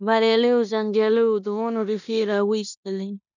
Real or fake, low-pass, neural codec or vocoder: fake; 7.2 kHz; codec, 16 kHz in and 24 kHz out, 0.9 kbps, LongCat-Audio-Codec, four codebook decoder